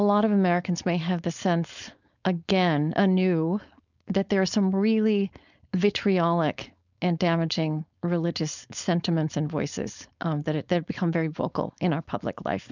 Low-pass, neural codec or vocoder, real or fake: 7.2 kHz; codec, 16 kHz, 4.8 kbps, FACodec; fake